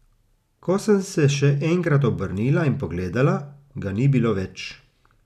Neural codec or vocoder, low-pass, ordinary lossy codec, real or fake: none; 14.4 kHz; none; real